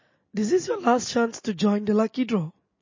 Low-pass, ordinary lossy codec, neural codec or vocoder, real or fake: 7.2 kHz; MP3, 32 kbps; none; real